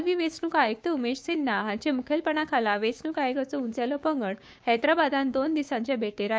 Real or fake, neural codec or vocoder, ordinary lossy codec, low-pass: fake; codec, 16 kHz, 6 kbps, DAC; none; none